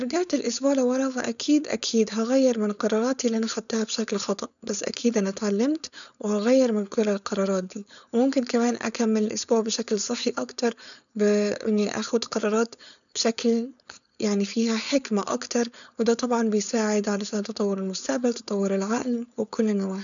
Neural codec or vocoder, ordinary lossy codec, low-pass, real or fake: codec, 16 kHz, 4.8 kbps, FACodec; none; 7.2 kHz; fake